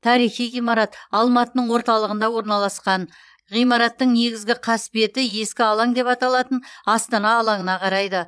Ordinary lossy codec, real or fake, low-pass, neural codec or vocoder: none; fake; none; vocoder, 22.05 kHz, 80 mel bands, Vocos